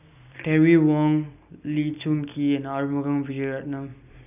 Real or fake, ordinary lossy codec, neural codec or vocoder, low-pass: real; none; none; 3.6 kHz